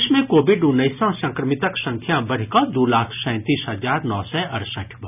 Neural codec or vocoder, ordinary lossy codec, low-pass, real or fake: none; none; 3.6 kHz; real